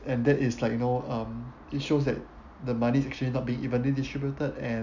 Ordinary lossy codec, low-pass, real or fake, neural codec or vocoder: none; 7.2 kHz; real; none